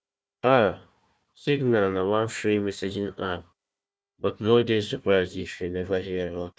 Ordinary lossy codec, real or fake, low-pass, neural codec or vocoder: none; fake; none; codec, 16 kHz, 1 kbps, FunCodec, trained on Chinese and English, 50 frames a second